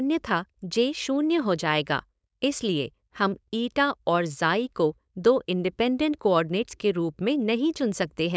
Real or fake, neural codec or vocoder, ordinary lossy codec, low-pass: fake; codec, 16 kHz, 4.8 kbps, FACodec; none; none